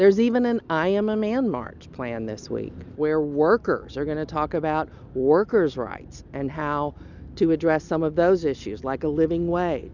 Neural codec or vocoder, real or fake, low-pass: none; real; 7.2 kHz